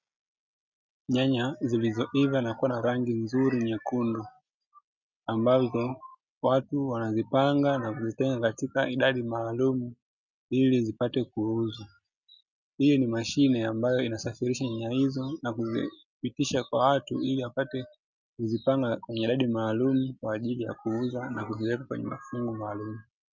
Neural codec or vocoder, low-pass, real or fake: none; 7.2 kHz; real